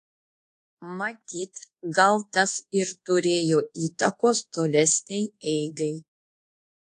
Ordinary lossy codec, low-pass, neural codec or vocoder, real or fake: AAC, 48 kbps; 10.8 kHz; codec, 24 kHz, 1.2 kbps, DualCodec; fake